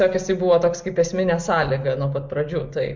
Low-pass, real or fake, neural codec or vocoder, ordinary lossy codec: 7.2 kHz; real; none; MP3, 64 kbps